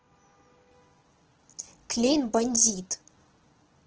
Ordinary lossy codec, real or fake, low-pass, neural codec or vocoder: Opus, 16 kbps; real; 7.2 kHz; none